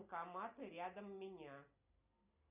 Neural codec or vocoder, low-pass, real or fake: none; 3.6 kHz; real